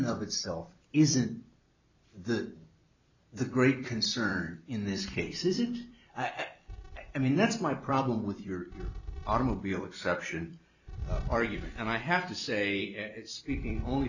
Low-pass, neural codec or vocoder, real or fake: 7.2 kHz; vocoder, 44.1 kHz, 128 mel bands every 256 samples, BigVGAN v2; fake